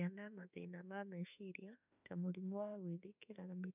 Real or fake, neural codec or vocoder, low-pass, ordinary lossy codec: fake; autoencoder, 48 kHz, 32 numbers a frame, DAC-VAE, trained on Japanese speech; 3.6 kHz; none